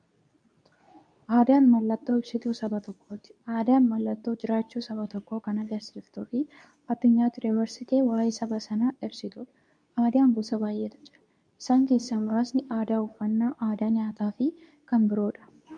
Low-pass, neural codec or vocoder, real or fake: 9.9 kHz; codec, 24 kHz, 0.9 kbps, WavTokenizer, medium speech release version 2; fake